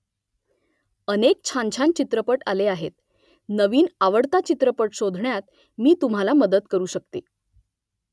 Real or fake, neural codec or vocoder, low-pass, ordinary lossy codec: real; none; none; none